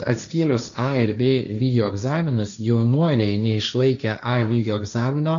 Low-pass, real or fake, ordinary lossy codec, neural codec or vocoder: 7.2 kHz; fake; MP3, 96 kbps; codec, 16 kHz, 1.1 kbps, Voila-Tokenizer